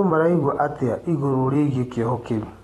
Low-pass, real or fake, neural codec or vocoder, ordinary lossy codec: 19.8 kHz; fake; vocoder, 44.1 kHz, 128 mel bands every 256 samples, BigVGAN v2; AAC, 32 kbps